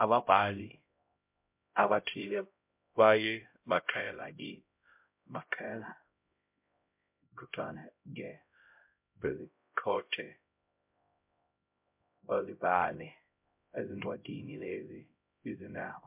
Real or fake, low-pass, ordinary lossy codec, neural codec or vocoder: fake; 3.6 kHz; MP3, 32 kbps; codec, 16 kHz, 0.5 kbps, X-Codec, HuBERT features, trained on LibriSpeech